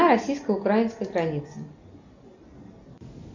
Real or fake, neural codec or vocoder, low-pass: real; none; 7.2 kHz